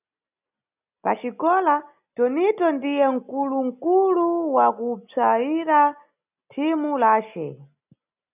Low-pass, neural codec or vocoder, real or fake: 3.6 kHz; none; real